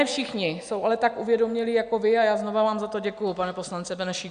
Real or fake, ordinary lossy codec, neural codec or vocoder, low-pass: real; MP3, 96 kbps; none; 9.9 kHz